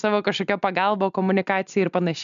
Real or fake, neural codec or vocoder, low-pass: real; none; 7.2 kHz